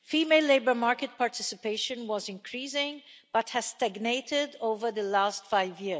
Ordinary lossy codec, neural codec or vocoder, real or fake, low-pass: none; none; real; none